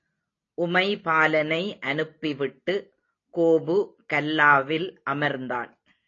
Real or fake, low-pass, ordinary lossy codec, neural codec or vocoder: real; 7.2 kHz; AAC, 32 kbps; none